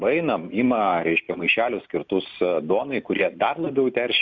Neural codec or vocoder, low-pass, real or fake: none; 7.2 kHz; real